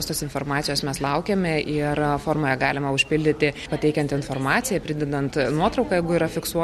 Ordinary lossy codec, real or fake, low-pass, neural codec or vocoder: MP3, 64 kbps; real; 19.8 kHz; none